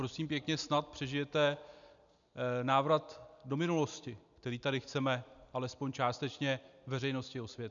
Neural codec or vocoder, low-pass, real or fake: none; 7.2 kHz; real